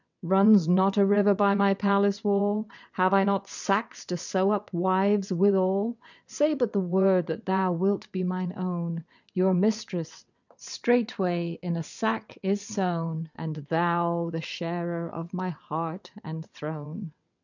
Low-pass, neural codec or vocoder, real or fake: 7.2 kHz; vocoder, 22.05 kHz, 80 mel bands, WaveNeXt; fake